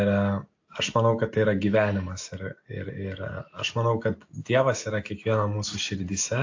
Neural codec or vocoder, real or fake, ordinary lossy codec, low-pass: none; real; AAC, 48 kbps; 7.2 kHz